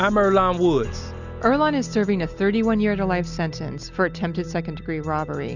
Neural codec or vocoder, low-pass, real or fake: none; 7.2 kHz; real